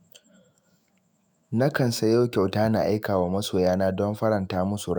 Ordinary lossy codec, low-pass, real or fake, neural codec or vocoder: none; none; fake; autoencoder, 48 kHz, 128 numbers a frame, DAC-VAE, trained on Japanese speech